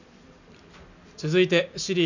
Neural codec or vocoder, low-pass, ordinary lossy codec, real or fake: none; 7.2 kHz; none; real